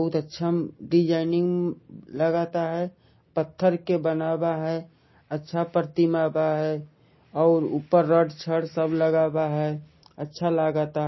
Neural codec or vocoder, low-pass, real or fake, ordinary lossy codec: none; 7.2 kHz; real; MP3, 24 kbps